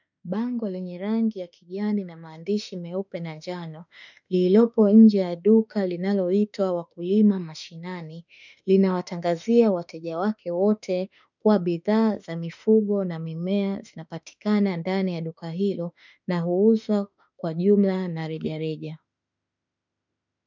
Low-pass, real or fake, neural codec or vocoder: 7.2 kHz; fake; autoencoder, 48 kHz, 32 numbers a frame, DAC-VAE, trained on Japanese speech